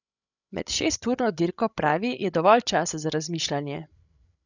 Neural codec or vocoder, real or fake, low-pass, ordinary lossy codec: codec, 16 kHz, 16 kbps, FreqCodec, larger model; fake; 7.2 kHz; none